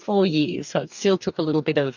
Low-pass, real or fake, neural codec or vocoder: 7.2 kHz; fake; codec, 44.1 kHz, 2.6 kbps, DAC